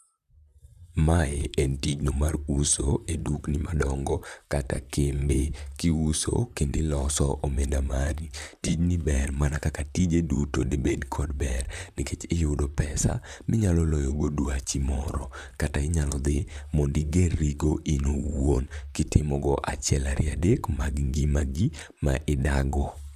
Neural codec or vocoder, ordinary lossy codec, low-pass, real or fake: vocoder, 44.1 kHz, 128 mel bands, Pupu-Vocoder; none; 14.4 kHz; fake